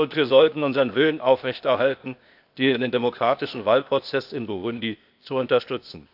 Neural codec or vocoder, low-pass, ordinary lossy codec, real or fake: codec, 16 kHz, 0.8 kbps, ZipCodec; 5.4 kHz; AAC, 48 kbps; fake